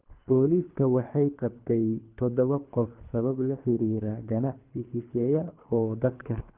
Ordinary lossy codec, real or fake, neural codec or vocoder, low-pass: Opus, 32 kbps; fake; codec, 24 kHz, 3 kbps, HILCodec; 3.6 kHz